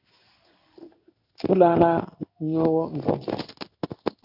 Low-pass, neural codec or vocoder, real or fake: 5.4 kHz; codec, 16 kHz in and 24 kHz out, 1 kbps, XY-Tokenizer; fake